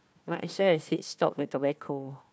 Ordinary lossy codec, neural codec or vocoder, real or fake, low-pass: none; codec, 16 kHz, 1 kbps, FunCodec, trained on Chinese and English, 50 frames a second; fake; none